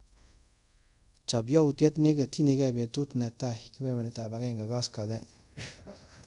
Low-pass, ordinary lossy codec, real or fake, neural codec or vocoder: 10.8 kHz; none; fake; codec, 24 kHz, 0.5 kbps, DualCodec